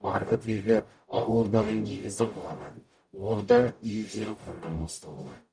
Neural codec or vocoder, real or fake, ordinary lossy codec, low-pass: codec, 44.1 kHz, 0.9 kbps, DAC; fake; none; 9.9 kHz